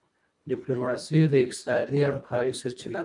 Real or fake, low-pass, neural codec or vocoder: fake; 10.8 kHz; codec, 24 kHz, 1.5 kbps, HILCodec